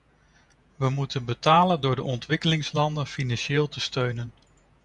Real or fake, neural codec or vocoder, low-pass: fake; vocoder, 48 kHz, 128 mel bands, Vocos; 10.8 kHz